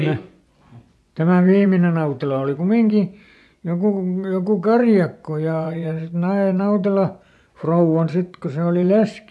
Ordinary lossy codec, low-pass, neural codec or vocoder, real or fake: none; none; vocoder, 24 kHz, 100 mel bands, Vocos; fake